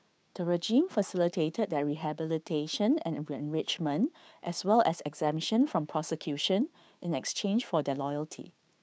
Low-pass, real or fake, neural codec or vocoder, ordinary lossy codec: none; fake; codec, 16 kHz, 6 kbps, DAC; none